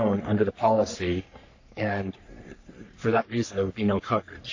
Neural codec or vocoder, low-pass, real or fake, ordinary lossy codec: codec, 44.1 kHz, 3.4 kbps, Pupu-Codec; 7.2 kHz; fake; AAC, 48 kbps